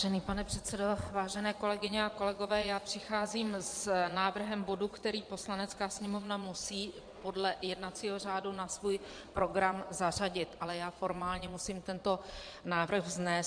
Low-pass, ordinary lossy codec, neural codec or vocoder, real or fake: 9.9 kHz; MP3, 64 kbps; vocoder, 22.05 kHz, 80 mel bands, Vocos; fake